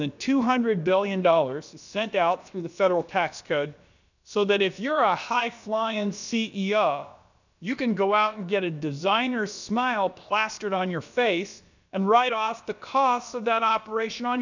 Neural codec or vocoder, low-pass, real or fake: codec, 16 kHz, about 1 kbps, DyCAST, with the encoder's durations; 7.2 kHz; fake